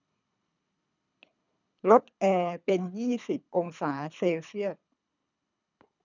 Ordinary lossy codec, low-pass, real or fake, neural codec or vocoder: none; 7.2 kHz; fake; codec, 24 kHz, 3 kbps, HILCodec